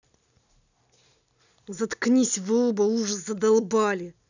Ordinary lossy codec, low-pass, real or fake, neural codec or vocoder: none; 7.2 kHz; real; none